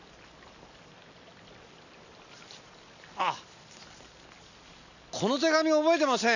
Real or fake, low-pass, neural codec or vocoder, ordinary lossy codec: real; 7.2 kHz; none; none